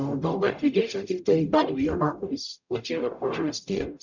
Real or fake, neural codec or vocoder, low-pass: fake; codec, 44.1 kHz, 0.9 kbps, DAC; 7.2 kHz